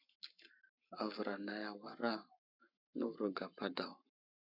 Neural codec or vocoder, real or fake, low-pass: codec, 44.1 kHz, 7.8 kbps, Pupu-Codec; fake; 5.4 kHz